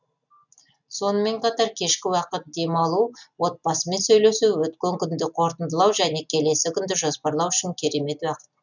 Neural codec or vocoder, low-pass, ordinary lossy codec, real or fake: none; 7.2 kHz; none; real